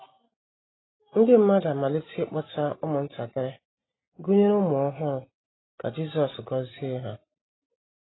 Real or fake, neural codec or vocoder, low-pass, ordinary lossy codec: real; none; 7.2 kHz; AAC, 16 kbps